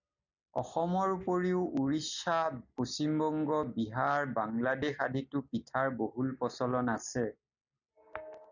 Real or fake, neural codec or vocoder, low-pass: real; none; 7.2 kHz